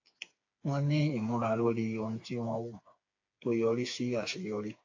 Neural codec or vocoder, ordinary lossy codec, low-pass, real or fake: codec, 16 kHz, 4 kbps, FreqCodec, smaller model; AAC, 48 kbps; 7.2 kHz; fake